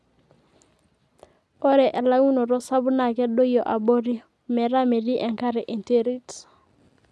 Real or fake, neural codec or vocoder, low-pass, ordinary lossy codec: real; none; none; none